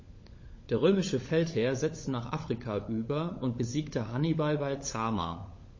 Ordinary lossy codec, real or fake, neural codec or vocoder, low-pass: MP3, 32 kbps; fake; codec, 16 kHz, 16 kbps, FunCodec, trained on LibriTTS, 50 frames a second; 7.2 kHz